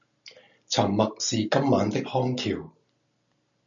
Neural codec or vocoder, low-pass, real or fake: none; 7.2 kHz; real